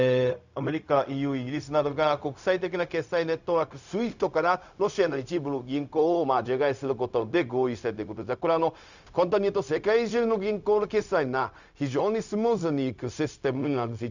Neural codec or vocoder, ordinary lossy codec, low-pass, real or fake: codec, 16 kHz, 0.4 kbps, LongCat-Audio-Codec; none; 7.2 kHz; fake